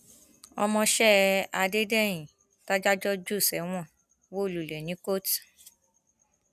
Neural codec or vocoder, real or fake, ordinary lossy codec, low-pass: none; real; none; 14.4 kHz